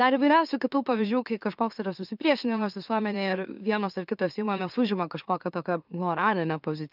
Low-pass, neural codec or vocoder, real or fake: 5.4 kHz; autoencoder, 44.1 kHz, a latent of 192 numbers a frame, MeloTTS; fake